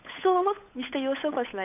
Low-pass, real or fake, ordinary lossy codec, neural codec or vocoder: 3.6 kHz; fake; none; codec, 16 kHz, 8 kbps, FunCodec, trained on Chinese and English, 25 frames a second